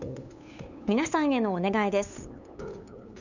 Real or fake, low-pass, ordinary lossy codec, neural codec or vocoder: fake; 7.2 kHz; none; codec, 16 kHz, 8 kbps, FunCodec, trained on LibriTTS, 25 frames a second